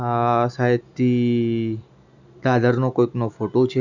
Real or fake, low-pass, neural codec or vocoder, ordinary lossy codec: real; 7.2 kHz; none; none